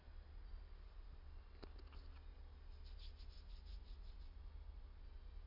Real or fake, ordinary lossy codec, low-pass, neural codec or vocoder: real; MP3, 32 kbps; 5.4 kHz; none